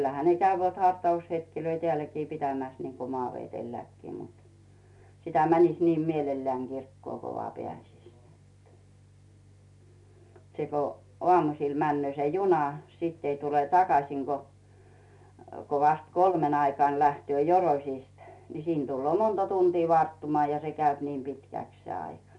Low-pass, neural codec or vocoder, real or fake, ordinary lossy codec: 10.8 kHz; none; real; none